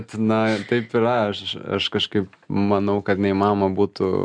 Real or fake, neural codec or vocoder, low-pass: real; none; 9.9 kHz